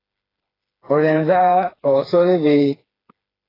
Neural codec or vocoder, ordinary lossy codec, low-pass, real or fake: codec, 16 kHz, 4 kbps, FreqCodec, smaller model; AAC, 24 kbps; 5.4 kHz; fake